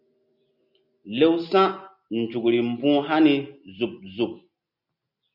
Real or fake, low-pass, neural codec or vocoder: real; 5.4 kHz; none